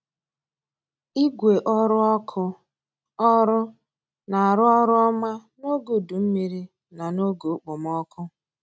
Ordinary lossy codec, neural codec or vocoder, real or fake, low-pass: none; none; real; none